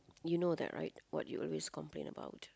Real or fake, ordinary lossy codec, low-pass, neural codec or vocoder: real; none; none; none